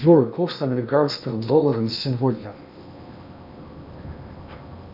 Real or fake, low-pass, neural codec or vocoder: fake; 5.4 kHz; codec, 16 kHz in and 24 kHz out, 0.8 kbps, FocalCodec, streaming, 65536 codes